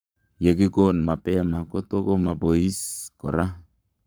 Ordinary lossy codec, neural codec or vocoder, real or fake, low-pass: none; codec, 44.1 kHz, 7.8 kbps, Pupu-Codec; fake; none